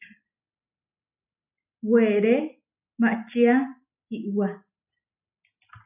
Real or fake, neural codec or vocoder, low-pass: real; none; 3.6 kHz